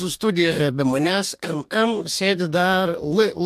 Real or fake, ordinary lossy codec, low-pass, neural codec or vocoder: fake; AAC, 96 kbps; 14.4 kHz; codec, 44.1 kHz, 2.6 kbps, DAC